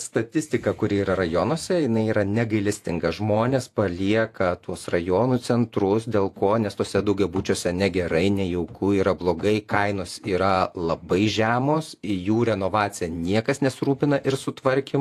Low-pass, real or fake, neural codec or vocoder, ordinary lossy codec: 14.4 kHz; fake; vocoder, 48 kHz, 128 mel bands, Vocos; AAC, 64 kbps